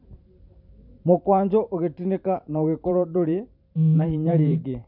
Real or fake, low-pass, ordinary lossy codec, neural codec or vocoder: fake; 5.4 kHz; none; vocoder, 44.1 kHz, 128 mel bands every 512 samples, BigVGAN v2